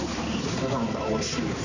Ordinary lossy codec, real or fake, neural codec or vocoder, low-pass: none; fake; codec, 16 kHz, 4 kbps, X-Codec, HuBERT features, trained on balanced general audio; 7.2 kHz